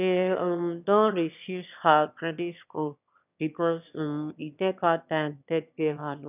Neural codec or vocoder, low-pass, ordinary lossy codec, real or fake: autoencoder, 22.05 kHz, a latent of 192 numbers a frame, VITS, trained on one speaker; 3.6 kHz; none; fake